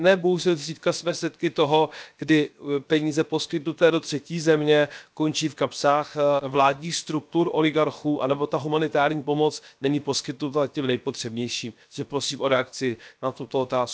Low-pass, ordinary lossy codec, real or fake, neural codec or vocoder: none; none; fake; codec, 16 kHz, 0.7 kbps, FocalCodec